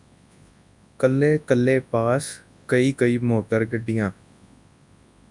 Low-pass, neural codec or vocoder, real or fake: 10.8 kHz; codec, 24 kHz, 0.9 kbps, WavTokenizer, large speech release; fake